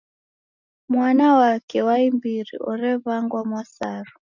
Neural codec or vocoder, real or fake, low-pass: none; real; 7.2 kHz